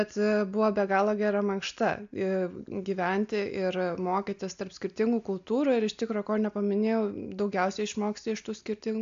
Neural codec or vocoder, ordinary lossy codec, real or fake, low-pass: none; AAC, 64 kbps; real; 7.2 kHz